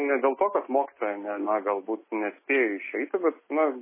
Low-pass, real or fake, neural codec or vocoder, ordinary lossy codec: 3.6 kHz; real; none; MP3, 16 kbps